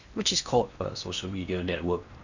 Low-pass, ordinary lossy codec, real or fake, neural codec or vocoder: 7.2 kHz; none; fake; codec, 16 kHz in and 24 kHz out, 0.8 kbps, FocalCodec, streaming, 65536 codes